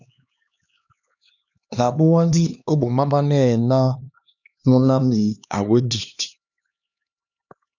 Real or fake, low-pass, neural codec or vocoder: fake; 7.2 kHz; codec, 16 kHz, 2 kbps, X-Codec, HuBERT features, trained on LibriSpeech